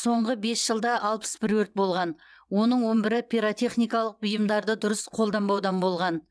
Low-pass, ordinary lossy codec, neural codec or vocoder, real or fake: none; none; vocoder, 22.05 kHz, 80 mel bands, WaveNeXt; fake